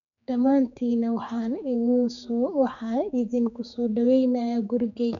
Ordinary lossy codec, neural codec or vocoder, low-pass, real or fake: none; codec, 16 kHz, 4 kbps, X-Codec, HuBERT features, trained on general audio; 7.2 kHz; fake